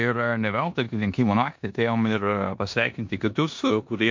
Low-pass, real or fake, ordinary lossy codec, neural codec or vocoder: 7.2 kHz; fake; MP3, 48 kbps; codec, 16 kHz in and 24 kHz out, 0.9 kbps, LongCat-Audio-Codec, four codebook decoder